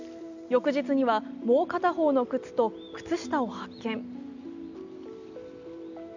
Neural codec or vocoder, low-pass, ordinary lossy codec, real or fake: none; 7.2 kHz; none; real